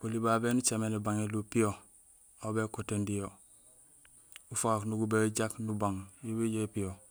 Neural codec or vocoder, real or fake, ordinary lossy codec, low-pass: none; real; none; none